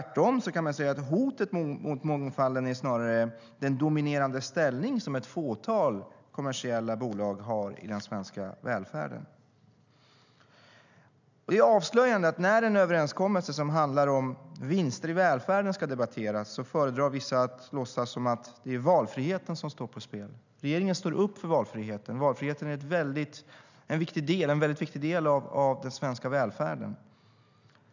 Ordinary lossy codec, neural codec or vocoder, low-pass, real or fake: none; none; 7.2 kHz; real